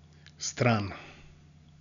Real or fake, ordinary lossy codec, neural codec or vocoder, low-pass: real; none; none; 7.2 kHz